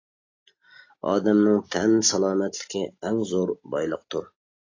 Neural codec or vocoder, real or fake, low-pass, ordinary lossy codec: none; real; 7.2 kHz; AAC, 32 kbps